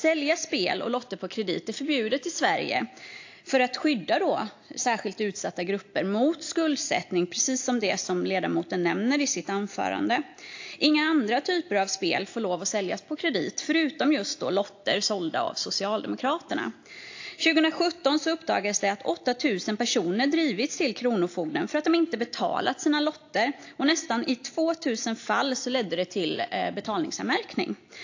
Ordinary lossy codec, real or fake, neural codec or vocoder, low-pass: AAC, 48 kbps; real; none; 7.2 kHz